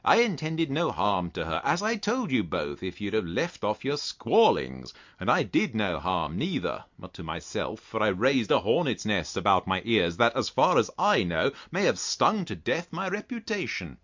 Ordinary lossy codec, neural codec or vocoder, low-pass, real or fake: MP3, 64 kbps; none; 7.2 kHz; real